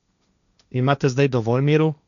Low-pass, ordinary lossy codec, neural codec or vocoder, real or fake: 7.2 kHz; none; codec, 16 kHz, 1.1 kbps, Voila-Tokenizer; fake